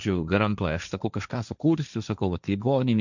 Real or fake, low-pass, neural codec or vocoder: fake; 7.2 kHz; codec, 16 kHz, 1.1 kbps, Voila-Tokenizer